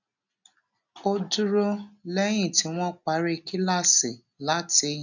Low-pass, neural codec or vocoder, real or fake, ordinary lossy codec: 7.2 kHz; none; real; none